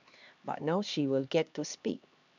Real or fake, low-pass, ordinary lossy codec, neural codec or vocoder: fake; 7.2 kHz; none; codec, 16 kHz, 2 kbps, X-Codec, HuBERT features, trained on LibriSpeech